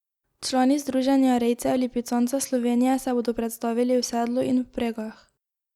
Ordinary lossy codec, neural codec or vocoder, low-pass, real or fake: Opus, 64 kbps; none; 19.8 kHz; real